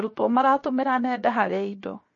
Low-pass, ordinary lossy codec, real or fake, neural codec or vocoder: 7.2 kHz; MP3, 32 kbps; fake; codec, 16 kHz, 0.7 kbps, FocalCodec